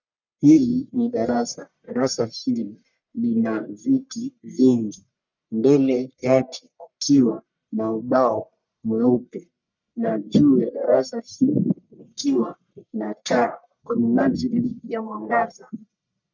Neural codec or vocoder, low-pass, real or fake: codec, 44.1 kHz, 1.7 kbps, Pupu-Codec; 7.2 kHz; fake